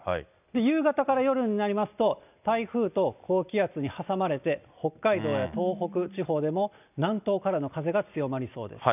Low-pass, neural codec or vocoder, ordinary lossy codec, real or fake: 3.6 kHz; none; none; real